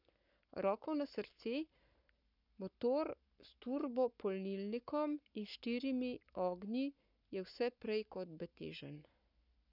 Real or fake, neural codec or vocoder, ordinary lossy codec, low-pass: fake; codec, 44.1 kHz, 7.8 kbps, Pupu-Codec; none; 5.4 kHz